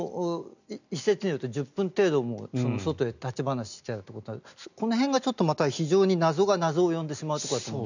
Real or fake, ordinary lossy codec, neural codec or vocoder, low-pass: real; none; none; 7.2 kHz